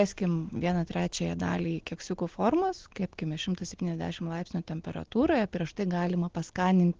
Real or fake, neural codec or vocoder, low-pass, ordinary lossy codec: real; none; 7.2 kHz; Opus, 16 kbps